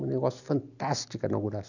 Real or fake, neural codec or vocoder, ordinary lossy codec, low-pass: real; none; none; 7.2 kHz